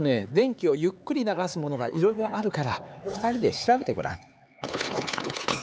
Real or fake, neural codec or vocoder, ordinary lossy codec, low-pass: fake; codec, 16 kHz, 4 kbps, X-Codec, HuBERT features, trained on LibriSpeech; none; none